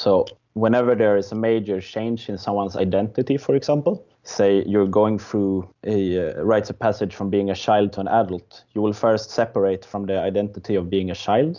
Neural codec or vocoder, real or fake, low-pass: none; real; 7.2 kHz